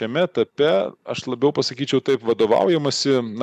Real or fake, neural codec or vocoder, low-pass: real; none; 14.4 kHz